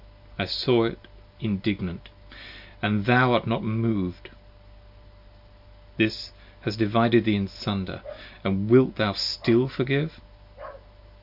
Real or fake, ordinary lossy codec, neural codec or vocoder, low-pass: real; AAC, 48 kbps; none; 5.4 kHz